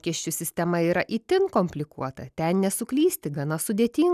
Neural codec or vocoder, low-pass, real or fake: none; 14.4 kHz; real